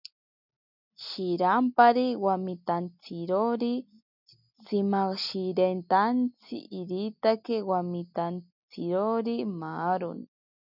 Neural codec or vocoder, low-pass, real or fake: none; 5.4 kHz; real